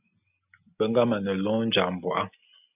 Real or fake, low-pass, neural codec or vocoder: real; 3.6 kHz; none